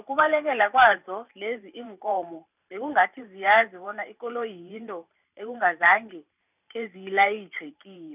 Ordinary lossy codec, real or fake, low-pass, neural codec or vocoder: none; fake; 3.6 kHz; vocoder, 44.1 kHz, 128 mel bands every 512 samples, BigVGAN v2